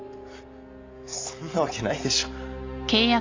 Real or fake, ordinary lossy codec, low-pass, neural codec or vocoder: real; MP3, 64 kbps; 7.2 kHz; none